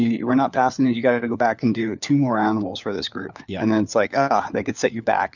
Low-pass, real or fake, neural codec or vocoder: 7.2 kHz; fake; codec, 16 kHz, 4 kbps, FunCodec, trained on LibriTTS, 50 frames a second